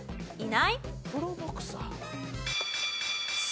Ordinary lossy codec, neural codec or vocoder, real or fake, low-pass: none; none; real; none